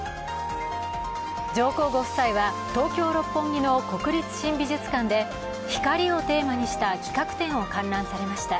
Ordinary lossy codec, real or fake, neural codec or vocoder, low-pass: none; real; none; none